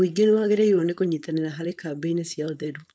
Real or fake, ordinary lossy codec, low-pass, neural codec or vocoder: fake; none; none; codec, 16 kHz, 4.8 kbps, FACodec